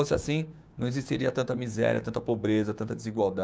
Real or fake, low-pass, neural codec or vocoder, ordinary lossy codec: fake; none; codec, 16 kHz, 6 kbps, DAC; none